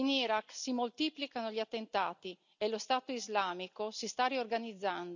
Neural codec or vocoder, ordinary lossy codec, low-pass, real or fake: none; none; 7.2 kHz; real